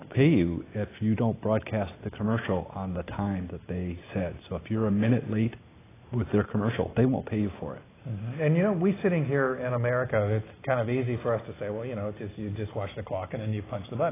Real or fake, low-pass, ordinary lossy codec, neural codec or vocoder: real; 3.6 kHz; AAC, 16 kbps; none